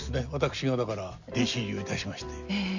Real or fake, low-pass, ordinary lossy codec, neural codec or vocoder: real; 7.2 kHz; none; none